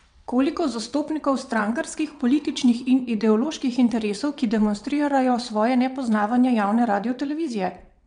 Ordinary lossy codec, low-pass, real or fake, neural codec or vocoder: none; 9.9 kHz; fake; vocoder, 22.05 kHz, 80 mel bands, WaveNeXt